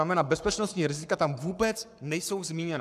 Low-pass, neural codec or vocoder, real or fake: 14.4 kHz; codec, 44.1 kHz, 7.8 kbps, Pupu-Codec; fake